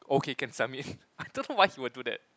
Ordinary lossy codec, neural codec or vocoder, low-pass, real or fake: none; none; none; real